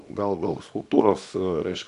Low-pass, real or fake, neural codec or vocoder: 10.8 kHz; fake; codec, 24 kHz, 0.9 kbps, WavTokenizer, small release